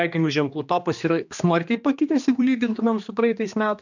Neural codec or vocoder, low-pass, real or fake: codec, 16 kHz, 2 kbps, X-Codec, HuBERT features, trained on general audio; 7.2 kHz; fake